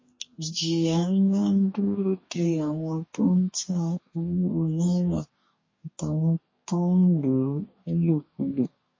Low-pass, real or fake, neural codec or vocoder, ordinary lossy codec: 7.2 kHz; fake; codec, 44.1 kHz, 2.6 kbps, DAC; MP3, 32 kbps